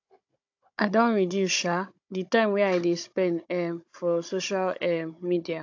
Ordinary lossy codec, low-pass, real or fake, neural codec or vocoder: AAC, 48 kbps; 7.2 kHz; fake; codec, 16 kHz, 16 kbps, FunCodec, trained on Chinese and English, 50 frames a second